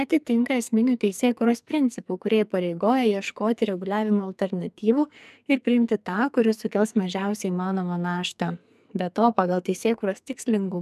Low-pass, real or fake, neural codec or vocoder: 14.4 kHz; fake; codec, 44.1 kHz, 2.6 kbps, SNAC